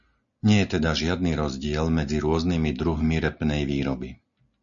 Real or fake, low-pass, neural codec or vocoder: real; 7.2 kHz; none